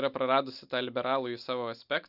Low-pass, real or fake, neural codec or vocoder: 5.4 kHz; real; none